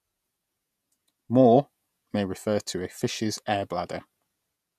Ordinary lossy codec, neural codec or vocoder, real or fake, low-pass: none; vocoder, 48 kHz, 128 mel bands, Vocos; fake; 14.4 kHz